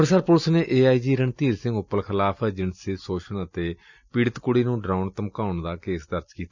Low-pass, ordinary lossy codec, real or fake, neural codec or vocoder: 7.2 kHz; none; real; none